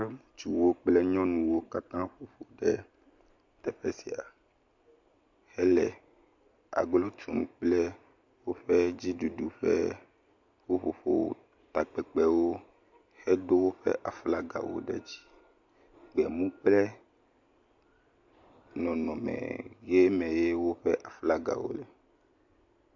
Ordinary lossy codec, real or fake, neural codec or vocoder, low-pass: MP3, 48 kbps; real; none; 7.2 kHz